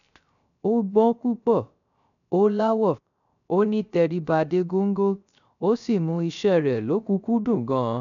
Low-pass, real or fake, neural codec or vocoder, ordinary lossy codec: 7.2 kHz; fake; codec, 16 kHz, 0.3 kbps, FocalCodec; none